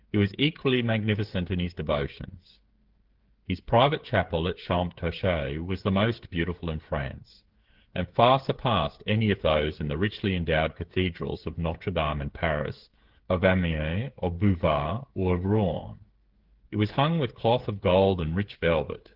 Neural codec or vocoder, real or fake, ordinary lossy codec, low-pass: codec, 16 kHz, 8 kbps, FreqCodec, smaller model; fake; Opus, 16 kbps; 5.4 kHz